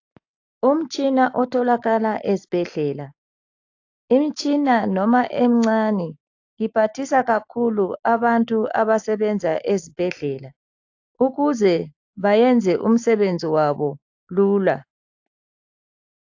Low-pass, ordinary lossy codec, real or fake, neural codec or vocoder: 7.2 kHz; AAC, 48 kbps; real; none